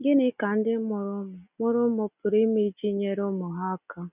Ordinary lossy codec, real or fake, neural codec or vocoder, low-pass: none; real; none; 3.6 kHz